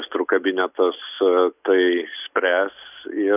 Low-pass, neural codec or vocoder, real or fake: 3.6 kHz; none; real